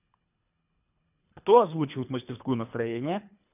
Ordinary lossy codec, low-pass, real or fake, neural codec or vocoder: none; 3.6 kHz; fake; codec, 24 kHz, 3 kbps, HILCodec